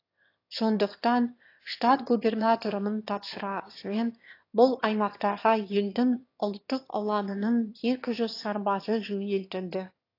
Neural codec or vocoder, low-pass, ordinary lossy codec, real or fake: autoencoder, 22.05 kHz, a latent of 192 numbers a frame, VITS, trained on one speaker; 5.4 kHz; AAC, 32 kbps; fake